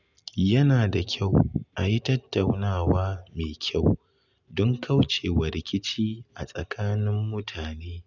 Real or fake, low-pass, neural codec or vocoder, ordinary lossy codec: real; 7.2 kHz; none; none